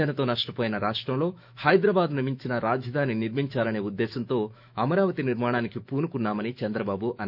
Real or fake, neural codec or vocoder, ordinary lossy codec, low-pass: fake; autoencoder, 48 kHz, 128 numbers a frame, DAC-VAE, trained on Japanese speech; none; 5.4 kHz